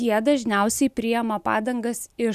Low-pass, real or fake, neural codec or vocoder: 14.4 kHz; real; none